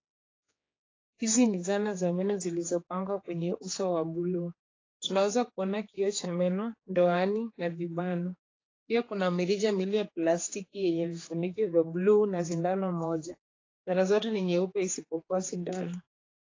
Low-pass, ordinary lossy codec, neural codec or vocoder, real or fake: 7.2 kHz; AAC, 32 kbps; codec, 16 kHz, 4 kbps, X-Codec, HuBERT features, trained on general audio; fake